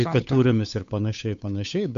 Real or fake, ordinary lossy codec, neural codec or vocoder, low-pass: fake; AAC, 64 kbps; codec, 16 kHz, 8 kbps, FunCodec, trained on Chinese and English, 25 frames a second; 7.2 kHz